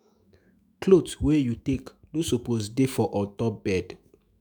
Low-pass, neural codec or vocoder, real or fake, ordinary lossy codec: none; autoencoder, 48 kHz, 128 numbers a frame, DAC-VAE, trained on Japanese speech; fake; none